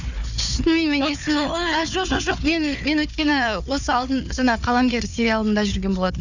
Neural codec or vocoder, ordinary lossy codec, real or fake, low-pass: codec, 16 kHz, 4 kbps, FunCodec, trained on Chinese and English, 50 frames a second; none; fake; 7.2 kHz